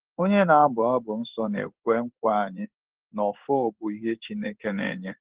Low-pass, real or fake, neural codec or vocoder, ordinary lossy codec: 3.6 kHz; fake; codec, 16 kHz in and 24 kHz out, 1 kbps, XY-Tokenizer; Opus, 16 kbps